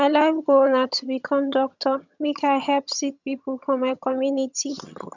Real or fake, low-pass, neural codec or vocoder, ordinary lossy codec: fake; 7.2 kHz; vocoder, 22.05 kHz, 80 mel bands, HiFi-GAN; none